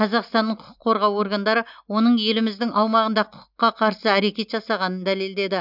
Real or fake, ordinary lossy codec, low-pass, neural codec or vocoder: real; none; 5.4 kHz; none